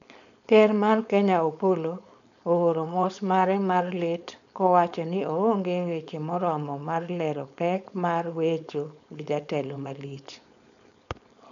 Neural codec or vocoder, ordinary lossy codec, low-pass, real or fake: codec, 16 kHz, 4.8 kbps, FACodec; none; 7.2 kHz; fake